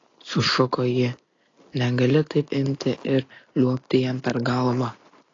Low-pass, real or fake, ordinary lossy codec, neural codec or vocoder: 7.2 kHz; real; AAC, 32 kbps; none